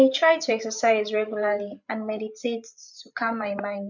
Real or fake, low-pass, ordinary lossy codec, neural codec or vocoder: fake; 7.2 kHz; none; codec, 16 kHz, 8 kbps, FreqCodec, larger model